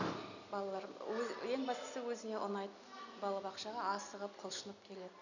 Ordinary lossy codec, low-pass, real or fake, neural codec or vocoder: none; 7.2 kHz; real; none